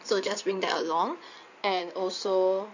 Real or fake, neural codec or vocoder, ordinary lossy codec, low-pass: fake; codec, 16 kHz in and 24 kHz out, 2.2 kbps, FireRedTTS-2 codec; none; 7.2 kHz